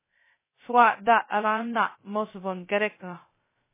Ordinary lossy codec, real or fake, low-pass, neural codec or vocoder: MP3, 16 kbps; fake; 3.6 kHz; codec, 16 kHz, 0.2 kbps, FocalCodec